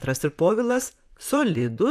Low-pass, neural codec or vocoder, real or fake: 14.4 kHz; vocoder, 44.1 kHz, 128 mel bands, Pupu-Vocoder; fake